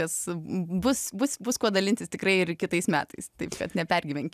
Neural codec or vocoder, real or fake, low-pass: none; real; 14.4 kHz